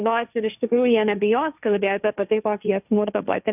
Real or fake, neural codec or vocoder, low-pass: fake; codec, 16 kHz, 1.1 kbps, Voila-Tokenizer; 3.6 kHz